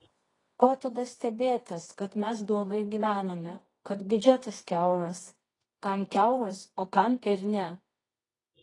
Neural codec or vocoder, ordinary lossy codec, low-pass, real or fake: codec, 24 kHz, 0.9 kbps, WavTokenizer, medium music audio release; AAC, 32 kbps; 10.8 kHz; fake